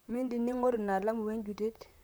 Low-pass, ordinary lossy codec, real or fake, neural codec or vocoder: none; none; fake; vocoder, 44.1 kHz, 128 mel bands, Pupu-Vocoder